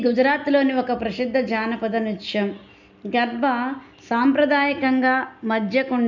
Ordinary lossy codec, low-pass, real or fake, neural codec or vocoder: none; 7.2 kHz; real; none